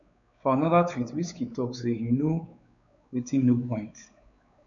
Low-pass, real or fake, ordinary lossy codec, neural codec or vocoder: 7.2 kHz; fake; none; codec, 16 kHz, 4 kbps, X-Codec, WavLM features, trained on Multilingual LibriSpeech